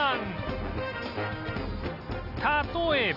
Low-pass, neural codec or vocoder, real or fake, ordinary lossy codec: 5.4 kHz; none; real; none